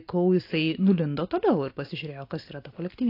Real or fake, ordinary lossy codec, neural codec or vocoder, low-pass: real; AAC, 32 kbps; none; 5.4 kHz